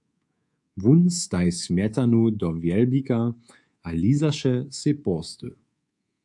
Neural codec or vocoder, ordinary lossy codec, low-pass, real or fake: codec, 24 kHz, 3.1 kbps, DualCodec; AAC, 64 kbps; 10.8 kHz; fake